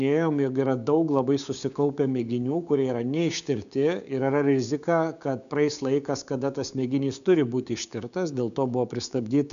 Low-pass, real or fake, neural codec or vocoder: 7.2 kHz; fake; codec, 16 kHz, 6 kbps, DAC